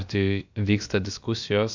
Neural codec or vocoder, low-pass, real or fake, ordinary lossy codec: codec, 16 kHz, about 1 kbps, DyCAST, with the encoder's durations; 7.2 kHz; fake; Opus, 64 kbps